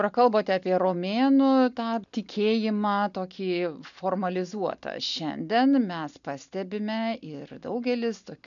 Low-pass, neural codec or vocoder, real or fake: 7.2 kHz; none; real